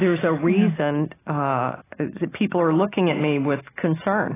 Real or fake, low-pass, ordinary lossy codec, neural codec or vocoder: real; 3.6 kHz; AAC, 16 kbps; none